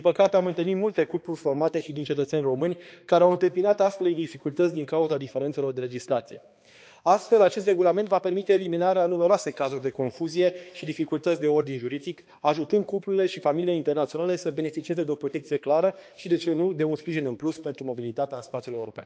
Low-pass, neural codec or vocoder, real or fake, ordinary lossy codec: none; codec, 16 kHz, 2 kbps, X-Codec, HuBERT features, trained on balanced general audio; fake; none